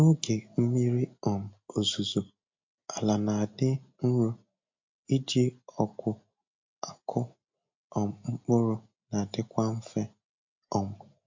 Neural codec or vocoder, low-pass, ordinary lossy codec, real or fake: none; 7.2 kHz; MP3, 48 kbps; real